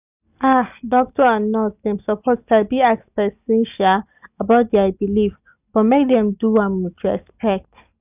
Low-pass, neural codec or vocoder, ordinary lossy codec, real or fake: 3.6 kHz; none; none; real